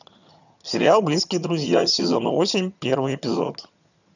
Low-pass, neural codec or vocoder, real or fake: 7.2 kHz; vocoder, 22.05 kHz, 80 mel bands, HiFi-GAN; fake